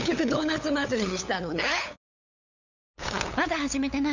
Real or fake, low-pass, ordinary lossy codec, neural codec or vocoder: fake; 7.2 kHz; none; codec, 16 kHz, 8 kbps, FunCodec, trained on LibriTTS, 25 frames a second